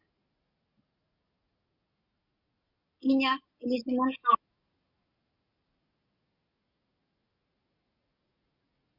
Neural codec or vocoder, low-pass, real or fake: none; 5.4 kHz; real